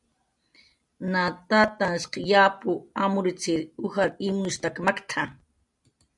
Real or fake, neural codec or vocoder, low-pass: real; none; 10.8 kHz